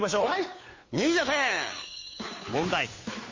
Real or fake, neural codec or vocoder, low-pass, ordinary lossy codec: fake; codec, 16 kHz, 2 kbps, FunCodec, trained on Chinese and English, 25 frames a second; 7.2 kHz; MP3, 32 kbps